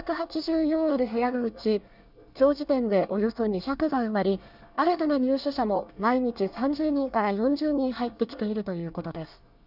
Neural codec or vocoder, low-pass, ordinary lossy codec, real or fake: codec, 24 kHz, 1 kbps, SNAC; 5.4 kHz; none; fake